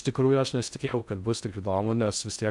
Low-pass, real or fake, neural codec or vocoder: 10.8 kHz; fake; codec, 16 kHz in and 24 kHz out, 0.6 kbps, FocalCodec, streaming, 2048 codes